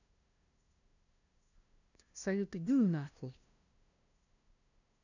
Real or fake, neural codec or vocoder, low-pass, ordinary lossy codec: fake; codec, 16 kHz, 0.5 kbps, FunCodec, trained on LibriTTS, 25 frames a second; 7.2 kHz; none